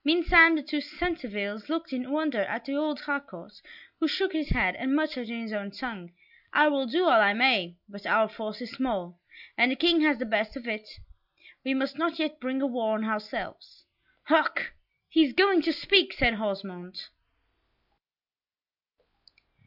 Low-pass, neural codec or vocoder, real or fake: 5.4 kHz; none; real